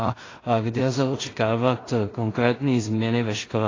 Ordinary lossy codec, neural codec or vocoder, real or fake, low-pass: AAC, 32 kbps; codec, 16 kHz in and 24 kHz out, 0.4 kbps, LongCat-Audio-Codec, two codebook decoder; fake; 7.2 kHz